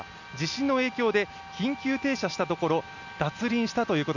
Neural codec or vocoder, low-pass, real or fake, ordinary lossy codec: none; 7.2 kHz; real; none